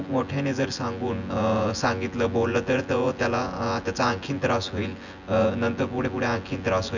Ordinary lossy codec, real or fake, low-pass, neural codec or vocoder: none; fake; 7.2 kHz; vocoder, 24 kHz, 100 mel bands, Vocos